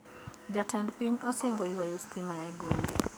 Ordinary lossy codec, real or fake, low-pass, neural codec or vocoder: none; fake; none; codec, 44.1 kHz, 2.6 kbps, SNAC